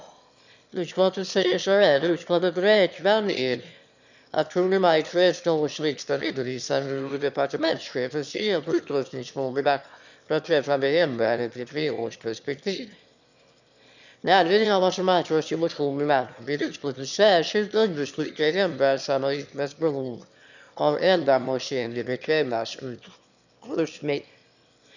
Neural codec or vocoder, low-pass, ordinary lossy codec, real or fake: autoencoder, 22.05 kHz, a latent of 192 numbers a frame, VITS, trained on one speaker; 7.2 kHz; none; fake